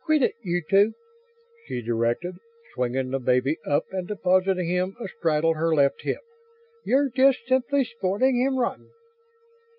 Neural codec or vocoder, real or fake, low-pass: none; real; 5.4 kHz